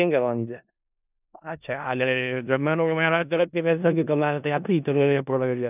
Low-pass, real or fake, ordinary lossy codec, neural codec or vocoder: 3.6 kHz; fake; none; codec, 16 kHz in and 24 kHz out, 0.4 kbps, LongCat-Audio-Codec, four codebook decoder